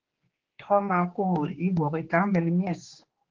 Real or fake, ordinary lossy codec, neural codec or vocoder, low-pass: fake; Opus, 16 kbps; codec, 16 kHz, 2 kbps, X-Codec, HuBERT features, trained on general audio; 7.2 kHz